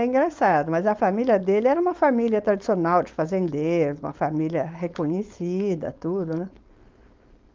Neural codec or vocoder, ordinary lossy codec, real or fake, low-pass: codec, 16 kHz, 4.8 kbps, FACodec; Opus, 32 kbps; fake; 7.2 kHz